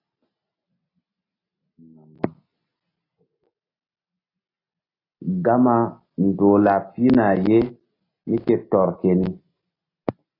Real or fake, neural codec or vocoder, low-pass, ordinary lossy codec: real; none; 5.4 kHz; AAC, 24 kbps